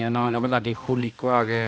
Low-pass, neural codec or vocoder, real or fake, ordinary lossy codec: none; codec, 16 kHz, 1 kbps, X-Codec, HuBERT features, trained on balanced general audio; fake; none